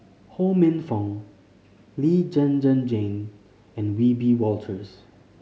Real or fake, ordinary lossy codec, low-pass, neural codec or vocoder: real; none; none; none